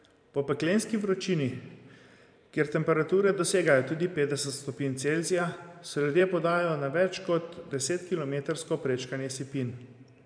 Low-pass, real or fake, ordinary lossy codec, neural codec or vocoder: 9.9 kHz; fake; none; vocoder, 44.1 kHz, 128 mel bands every 512 samples, BigVGAN v2